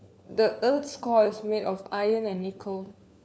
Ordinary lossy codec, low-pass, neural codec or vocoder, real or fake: none; none; codec, 16 kHz, 4 kbps, FunCodec, trained on LibriTTS, 50 frames a second; fake